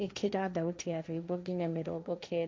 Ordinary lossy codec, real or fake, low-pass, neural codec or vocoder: none; fake; none; codec, 16 kHz, 1.1 kbps, Voila-Tokenizer